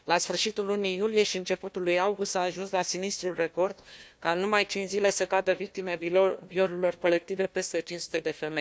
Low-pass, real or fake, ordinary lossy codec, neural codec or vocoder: none; fake; none; codec, 16 kHz, 1 kbps, FunCodec, trained on Chinese and English, 50 frames a second